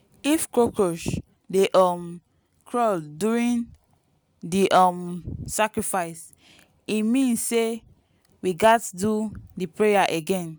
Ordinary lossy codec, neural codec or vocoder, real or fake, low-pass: none; none; real; none